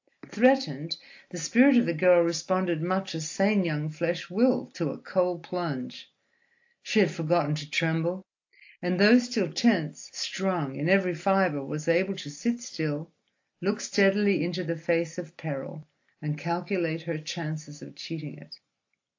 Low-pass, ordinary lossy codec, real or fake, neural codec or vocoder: 7.2 kHz; AAC, 48 kbps; real; none